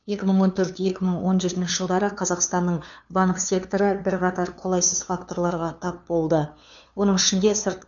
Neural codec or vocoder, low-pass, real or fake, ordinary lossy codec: codec, 16 kHz, 2 kbps, FunCodec, trained on LibriTTS, 25 frames a second; 7.2 kHz; fake; none